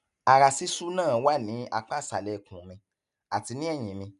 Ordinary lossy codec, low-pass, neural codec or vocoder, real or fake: none; 10.8 kHz; none; real